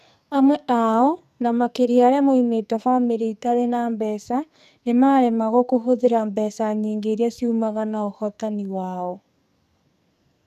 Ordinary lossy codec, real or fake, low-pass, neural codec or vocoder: none; fake; 14.4 kHz; codec, 44.1 kHz, 2.6 kbps, SNAC